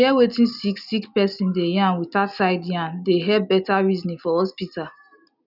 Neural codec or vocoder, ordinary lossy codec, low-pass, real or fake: none; none; 5.4 kHz; real